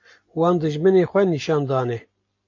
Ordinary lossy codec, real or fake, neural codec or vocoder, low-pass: AAC, 48 kbps; real; none; 7.2 kHz